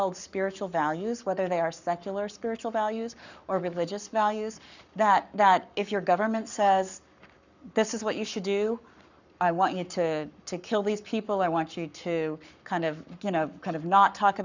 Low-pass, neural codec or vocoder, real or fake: 7.2 kHz; codec, 44.1 kHz, 7.8 kbps, Pupu-Codec; fake